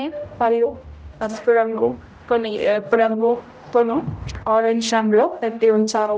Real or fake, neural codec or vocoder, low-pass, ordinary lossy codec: fake; codec, 16 kHz, 0.5 kbps, X-Codec, HuBERT features, trained on general audio; none; none